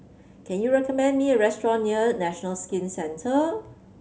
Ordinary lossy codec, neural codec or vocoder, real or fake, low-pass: none; none; real; none